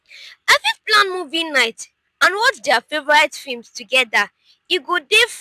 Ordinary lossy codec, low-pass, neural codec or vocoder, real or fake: none; 14.4 kHz; none; real